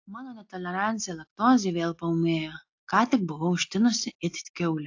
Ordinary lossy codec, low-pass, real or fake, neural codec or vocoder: AAC, 48 kbps; 7.2 kHz; real; none